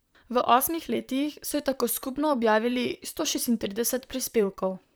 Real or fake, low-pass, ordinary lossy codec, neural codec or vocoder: fake; none; none; vocoder, 44.1 kHz, 128 mel bands, Pupu-Vocoder